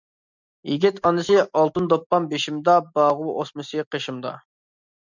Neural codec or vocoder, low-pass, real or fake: none; 7.2 kHz; real